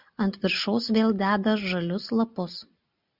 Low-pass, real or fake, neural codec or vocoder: 5.4 kHz; real; none